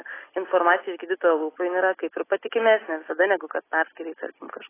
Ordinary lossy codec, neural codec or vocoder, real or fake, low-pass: AAC, 16 kbps; none; real; 3.6 kHz